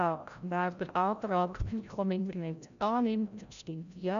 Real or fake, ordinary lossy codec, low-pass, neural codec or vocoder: fake; none; 7.2 kHz; codec, 16 kHz, 0.5 kbps, FreqCodec, larger model